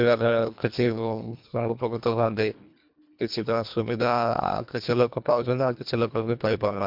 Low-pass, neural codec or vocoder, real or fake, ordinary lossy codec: 5.4 kHz; codec, 24 kHz, 1.5 kbps, HILCodec; fake; MP3, 48 kbps